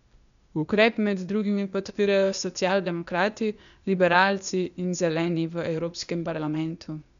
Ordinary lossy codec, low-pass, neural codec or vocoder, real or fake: none; 7.2 kHz; codec, 16 kHz, 0.8 kbps, ZipCodec; fake